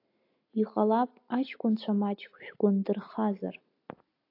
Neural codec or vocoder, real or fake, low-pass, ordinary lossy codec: none; real; 5.4 kHz; AAC, 32 kbps